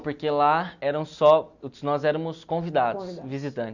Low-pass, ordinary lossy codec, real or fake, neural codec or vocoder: 7.2 kHz; none; real; none